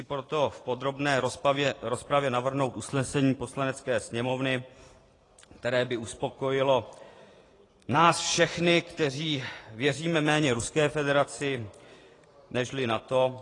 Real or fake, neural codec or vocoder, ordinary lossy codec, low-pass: fake; vocoder, 44.1 kHz, 128 mel bands every 512 samples, BigVGAN v2; AAC, 32 kbps; 10.8 kHz